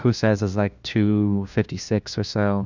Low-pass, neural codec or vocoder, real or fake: 7.2 kHz; codec, 16 kHz, 1 kbps, FunCodec, trained on LibriTTS, 50 frames a second; fake